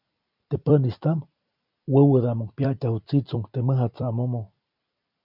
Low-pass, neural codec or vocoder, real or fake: 5.4 kHz; vocoder, 44.1 kHz, 128 mel bands every 256 samples, BigVGAN v2; fake